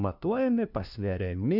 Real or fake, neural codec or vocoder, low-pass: fake; codec, 16 kHz, 1 kbps, FunCodec, trained on LibriTTS, 50 frames a second; 5.4 kHz